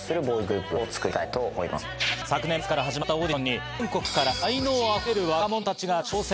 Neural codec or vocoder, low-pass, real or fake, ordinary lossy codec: none; none; real; none